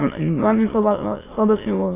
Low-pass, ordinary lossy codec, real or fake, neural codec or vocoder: 3.6 kHz; AAC, 16 kbps; fake; autoencoder, 22.05 kHz, a latent of 192 numbers a frame, VITS, trained on many speakers